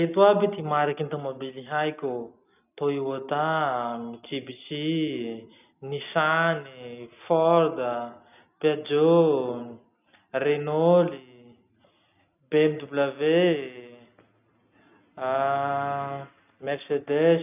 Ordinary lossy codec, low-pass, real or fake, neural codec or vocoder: none; 3.6 kHz; real; none